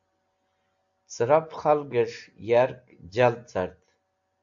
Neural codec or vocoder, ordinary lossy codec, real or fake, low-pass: none; AAC, 64 kbps; real; 7.2 kHz